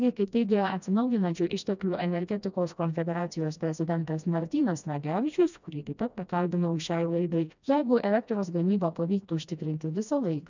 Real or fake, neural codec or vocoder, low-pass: fake; codec, 16 kHz, 1 kbps, FreqCodec, smaller model; 7.2 kHz